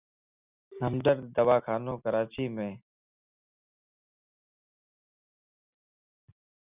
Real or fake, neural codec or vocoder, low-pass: real; none; 3.6 kHz